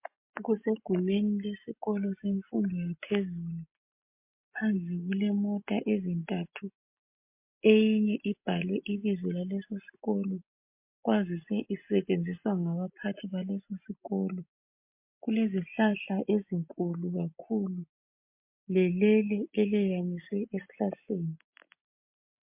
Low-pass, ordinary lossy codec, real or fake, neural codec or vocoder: 3.6 kHz; MP3, 32 kbps; real; none